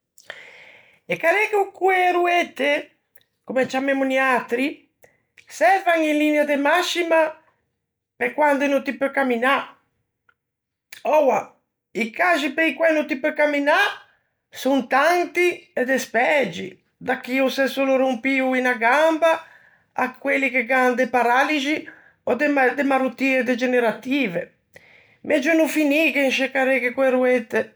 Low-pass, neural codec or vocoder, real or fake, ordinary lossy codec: none; none; real; none